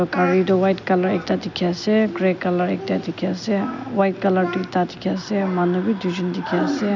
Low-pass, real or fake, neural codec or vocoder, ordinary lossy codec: 7.2 kHz; real; none; none